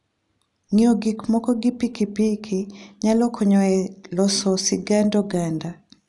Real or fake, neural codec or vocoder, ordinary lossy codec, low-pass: real; none; none; 10.8 kHz